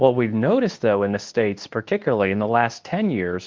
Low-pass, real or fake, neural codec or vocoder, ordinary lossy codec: 7.2 kHz; fake; codec, 24 kHz, 0.9 kbps, WavTokenizer, medium speech release version 2; Opus, 24 kbps